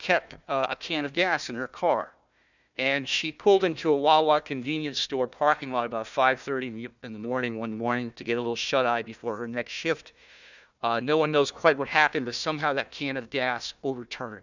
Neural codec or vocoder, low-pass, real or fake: codec, 16 kHz, 1 kbps, FunCodec, trained on Chinese and English, 50 frames a second; 7.2 kHz; fake